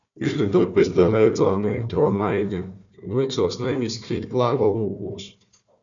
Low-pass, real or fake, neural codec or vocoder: 7.2 kHz; fake; codec, 16 kHz, 1 kbps, FunCodec, trained on Chinese and English, 50 frames a second